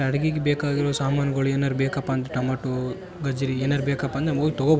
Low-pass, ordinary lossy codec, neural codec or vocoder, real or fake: none; none; none; real